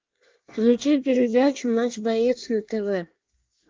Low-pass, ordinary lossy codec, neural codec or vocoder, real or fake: 7.2 kHz; Opus, 24 kbps; codec, 24 kHz, 1 kbps, SNAC; fake